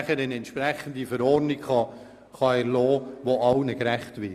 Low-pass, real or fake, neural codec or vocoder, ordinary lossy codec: 14.4 kHz; real; none; Opus, 64 kbps